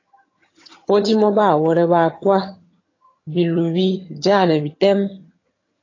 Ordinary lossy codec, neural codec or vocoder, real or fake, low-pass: AAC, 32 kbps; vocoder, 22.05 kHz, 80 mel bands, HiFi-GAN; fake; 7.2 kHz